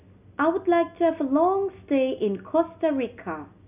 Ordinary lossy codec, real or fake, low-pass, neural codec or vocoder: none; real; 3.6 kHz; none